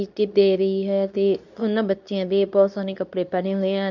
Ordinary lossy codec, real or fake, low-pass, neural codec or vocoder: none; fake; 7.2 kHz; codec, 24 kHz, 0.9 kbps, WavTokenizer, medium speech release version 2